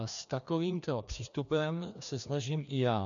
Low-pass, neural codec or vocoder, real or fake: 7.2 kHz; codec, 16 kHz, 2 kbps, FreqCodec, larger model; fake